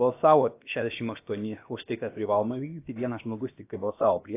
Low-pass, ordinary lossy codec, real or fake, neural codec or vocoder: 3.6 kHz; AAC, 24 kbps; fake; codec, 16 kHz, about 1 kbps, DyCAST, with the encoder's durations